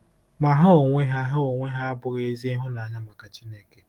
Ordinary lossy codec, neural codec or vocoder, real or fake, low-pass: Opus, 24 kbps; codec, 44.1 kHz, 7.8 kbps, DAC; fake; 19.8 kHz